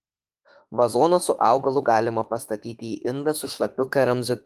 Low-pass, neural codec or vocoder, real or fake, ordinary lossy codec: 14.4 kHz; autoencoder, 48 kHz, 32 numbers a frame, DAC-VAE, trained on Japanese speech; fake; Opus, 24 kbps